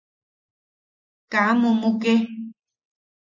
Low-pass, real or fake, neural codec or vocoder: 7.2 kHz; real; none